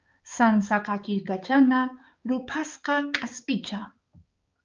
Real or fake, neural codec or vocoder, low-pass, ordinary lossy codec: fake; codec, 16 kHz, 4 kbps, X-Codec, HuBERT features, trained on balanced general audio; 7.2 kHz; Opus, 24 kbps